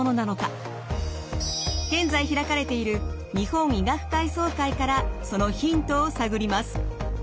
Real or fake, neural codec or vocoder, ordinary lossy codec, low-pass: real; none; none; none